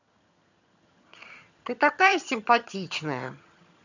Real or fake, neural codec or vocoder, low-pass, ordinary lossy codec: fake; vocoder, 22.05 kHz, 80 mel bands, HiFi-GAN; 7.2 kHz; none